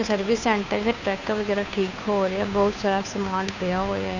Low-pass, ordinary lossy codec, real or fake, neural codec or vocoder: 7.2 kHz; none; fake; codec, 16 kHz, 2 kbps, FunCodec, trained on Chinese and English, 25 frames a second